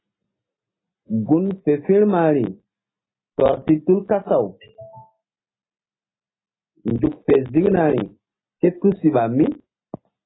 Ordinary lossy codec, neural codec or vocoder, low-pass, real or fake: AAC, 16 kbps; none; 7.2 kHz; real